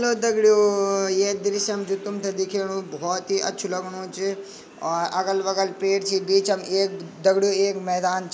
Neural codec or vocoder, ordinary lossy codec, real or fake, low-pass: none; none; real; none